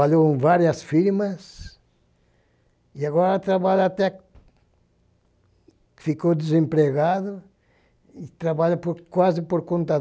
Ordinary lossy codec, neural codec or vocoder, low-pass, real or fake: none; none; none; real